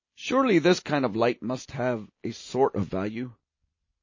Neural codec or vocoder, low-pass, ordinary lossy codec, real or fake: none; 7.2 kHz; MP3, 32 kbps; real